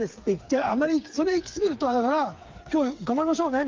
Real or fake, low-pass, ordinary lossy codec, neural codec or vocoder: fake; 7.2 kHz; Opus, 24 kbps; codec, 16 kHz, 4 kbps, FreqCodec, smaller model